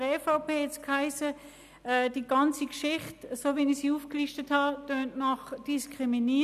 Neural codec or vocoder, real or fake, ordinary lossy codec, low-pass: none; real; none; 14.4 kHz